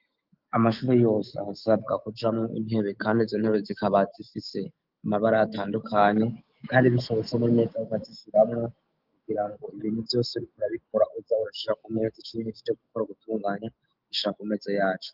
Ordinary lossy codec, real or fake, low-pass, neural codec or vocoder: Opus, 32 kbps; fake; 5.4 kHz; autoencoder, 48 kHz, 128 numbers a frame, DAC-VAE, trained on Japanese speech